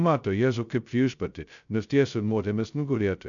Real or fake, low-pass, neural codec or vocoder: fake; 7.2 kHz; codec, 16 kHz, 0.2 kbps, FocalCodec